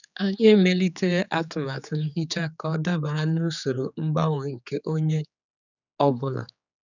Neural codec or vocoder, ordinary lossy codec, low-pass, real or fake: codec, 16 kHz, 4 kbps, X-Codec, HuBERT features, trained on general audio; none; 7.2 kHz; fake